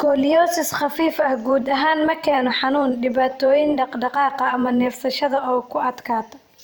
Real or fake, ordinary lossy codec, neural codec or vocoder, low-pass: fake; none; vocoder, 44.1 kHz, 128 mel bands every 512 samples, BigVGAN v2; none